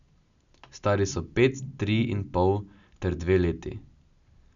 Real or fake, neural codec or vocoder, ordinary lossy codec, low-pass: real; none; none; 7.2 kHz